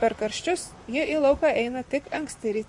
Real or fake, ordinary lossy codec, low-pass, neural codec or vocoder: fake; MP3, 48 kbps; 10.8 kHz; vocoder, 44.1 kHz, 128 mel bands, Pupu-Vocoder